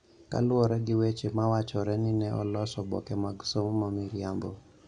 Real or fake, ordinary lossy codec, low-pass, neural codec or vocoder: real; none; 9.9 kHz; none